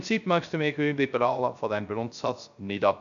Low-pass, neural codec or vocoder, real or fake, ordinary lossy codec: 7.2 kHz; codec, 16 kHz, 0.3 kbps, FocalCodec; fake; none